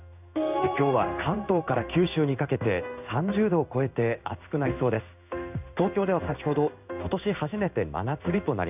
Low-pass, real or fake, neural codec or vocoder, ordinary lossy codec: 3.6 kHz; fake; codec, 16 kHz in and 24 kHz out, 1 kbps, XY-Tokenizer; none